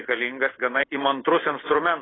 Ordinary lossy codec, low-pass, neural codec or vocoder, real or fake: AAC, 16 kbps; 7.2 kHz; none; real